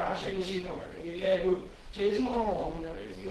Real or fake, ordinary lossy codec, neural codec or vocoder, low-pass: fake; Opus, 16 kbps; codec, 24 kHz, 0.9 kbps, WavTokenizer, small release; 10.8 kHz